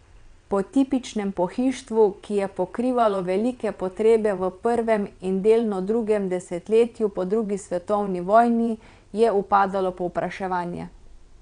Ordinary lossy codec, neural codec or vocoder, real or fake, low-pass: none; vocoder, 22.05 kHz, 80 mel bands, WaveNeXt; fake; 9.9 kHz